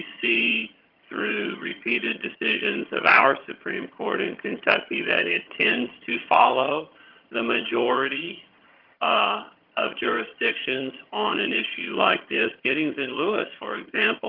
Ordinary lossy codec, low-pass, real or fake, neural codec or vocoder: Opus, 24 kbps; 5.4 kHz; fake; vocoder, 22.05 kHz, 80 mel bands, HiFi-GAN